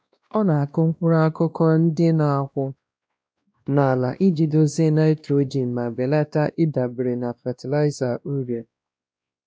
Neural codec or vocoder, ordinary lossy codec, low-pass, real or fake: codec, 16 kHz, 1 kbps, X-Codec, WavLM features, trained on Multilingual LibriSpeech; none; none; fake